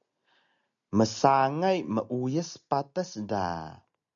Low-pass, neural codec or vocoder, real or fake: 7.2 kHz; none; real